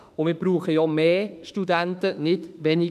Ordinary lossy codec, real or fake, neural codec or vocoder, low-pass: none; fake; autoencoder, 48 kHz, 32 numbers a frame, DAC-VAE, trained on Japanese speech; 14.4 kHz